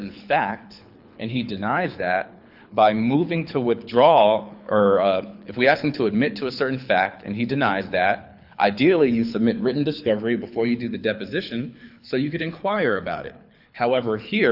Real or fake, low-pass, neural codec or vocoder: fake; 5.4 kHz; codec, 24 kHz, 6 kbps, HILCodec